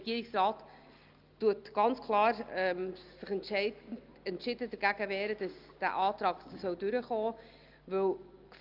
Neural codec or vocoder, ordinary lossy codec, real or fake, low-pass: none; Opus, 32 kbps; real; 5.4 kHz